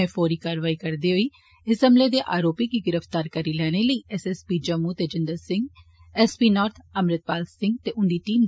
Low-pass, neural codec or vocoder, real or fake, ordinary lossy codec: none; none; real; none